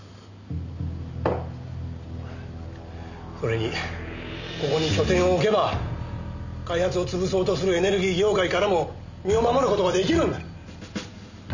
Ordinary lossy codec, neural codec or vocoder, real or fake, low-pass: none; none; real; 7.2 kHz